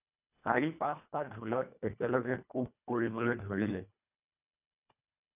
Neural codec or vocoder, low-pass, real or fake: codec, 24 kHz, 1.5 kbps, HILCodec; 3.6 kHz; fake